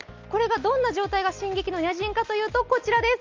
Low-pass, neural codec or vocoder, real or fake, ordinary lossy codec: 7.2 kHz; none; real; Opus, 32 kbps